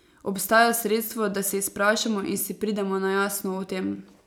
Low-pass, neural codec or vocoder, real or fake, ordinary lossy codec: none; none; real; none